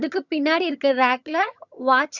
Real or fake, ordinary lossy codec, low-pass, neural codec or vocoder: fake; none; 7.2 kHz; vocoder, 22.05 kHz, 80 mel bands, HiFi-GAN